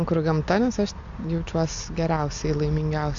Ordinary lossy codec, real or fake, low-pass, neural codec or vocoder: AAC, 64 kbps; real; 7.2 kHz; none